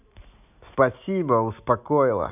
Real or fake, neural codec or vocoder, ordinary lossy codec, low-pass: real; none; none; 3.6 kHz